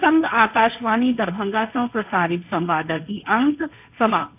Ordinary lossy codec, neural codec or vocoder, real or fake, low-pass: AAC, 32 kbps; codec, 16 kHz, 1.1 kbps, Voila-Tokenizer; fake; 3.6 kHz